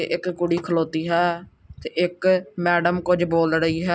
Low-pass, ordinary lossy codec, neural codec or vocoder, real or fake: none; none; none; real